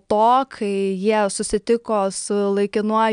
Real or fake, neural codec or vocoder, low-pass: real; none; 9.9 kHz